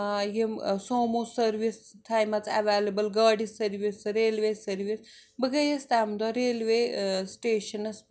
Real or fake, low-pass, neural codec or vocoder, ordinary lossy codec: real; none; none; none